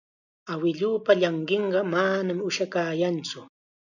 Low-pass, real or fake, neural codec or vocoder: 7.2 kHz; real; none